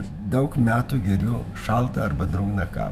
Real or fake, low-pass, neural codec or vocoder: fake; 14.4 kHz; autoencoder, 48 kHz, 128 numbers a frame, DAC-VAE, trained on Japanese speech